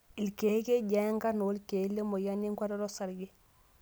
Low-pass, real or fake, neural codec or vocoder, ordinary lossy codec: none; real; none; none